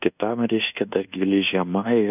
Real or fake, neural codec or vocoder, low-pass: fake; codec, 24 kHz, 1.2 kbps, DualCodec; 3.6 kHz